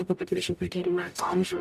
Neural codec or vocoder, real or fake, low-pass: codec, 44.1 kHz, 0.9 kbps, DAC; fake; 14.4 kHz